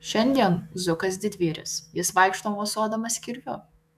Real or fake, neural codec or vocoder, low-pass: fake; autoencoder, 48 kHz, 128 numbers a frame, DAC-VAE, trained on Japanese speech; 14.4 kHz